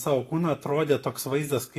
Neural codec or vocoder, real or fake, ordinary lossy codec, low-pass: none; real; AAC, 48 kbps; 14.4 kHz